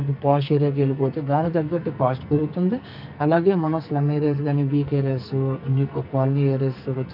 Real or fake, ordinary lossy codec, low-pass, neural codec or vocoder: fake; none; 5.4 kHz; codec, 44.1 kHz, 2.6 kbps, SNAC